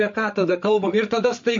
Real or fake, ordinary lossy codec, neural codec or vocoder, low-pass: fake; MP3, 48 kbps; codec, 16 kHz, 8 kbps, FreqCodec, larger model; 7.2 kHz